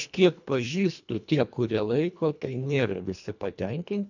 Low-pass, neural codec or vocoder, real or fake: 7.2 kHz; codec, 24 kHz, 1.5 kbps, HILCodec; fake